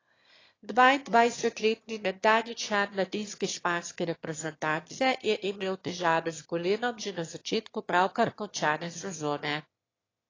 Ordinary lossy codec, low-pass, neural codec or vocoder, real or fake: AAC, 32 kbps; 7.2 kHz; autoencoder, 22.05 kHz, a latent of 192 numbers a frame, VITS, trained on one speaker; fake